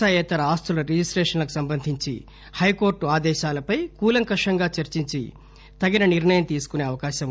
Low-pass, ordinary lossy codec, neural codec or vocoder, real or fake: none; none; none; real